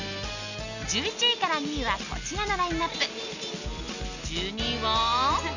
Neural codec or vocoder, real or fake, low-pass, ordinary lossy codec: none; real; 7.2 kHz; none